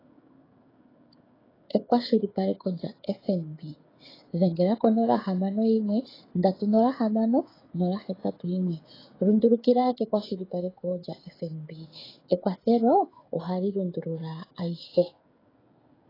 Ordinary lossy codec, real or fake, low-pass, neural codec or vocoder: AAC, 24 kbps; fake; 5.4 kHz; codec, 16 kHz, 16 kbps, FreqCodec, smaller model